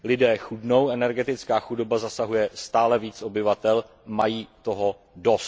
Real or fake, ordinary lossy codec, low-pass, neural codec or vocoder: real; none; none; none